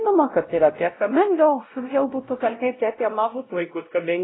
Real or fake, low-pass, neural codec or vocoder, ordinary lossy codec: fake; 7.2 kHz; codec, 16 kHz, 0.5 kbps, X-Codec, WavLM features, trained on Multilingual LibriSpeech; AAC, 16 kbps